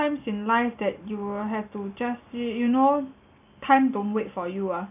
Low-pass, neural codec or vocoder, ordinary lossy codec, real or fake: 3.6 kHz; none; none; real